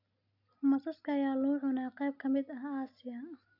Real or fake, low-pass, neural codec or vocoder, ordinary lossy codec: real; 5.4 kHz; none; none